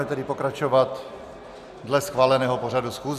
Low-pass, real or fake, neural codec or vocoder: 14.4 kHz; real; none